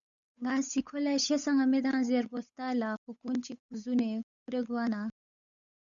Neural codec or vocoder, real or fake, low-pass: codec, 16 kHz, 8 kbps, FunCodec, trained on Chinese and English, 25 frames a second; fake; 7.2 kHz